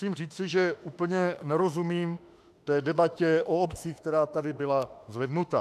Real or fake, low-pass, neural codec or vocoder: fake; 14.4 kHz; autoencoder, 48 kHz, 32 numbers a frame, DAC-VAE, trained on Japanese speech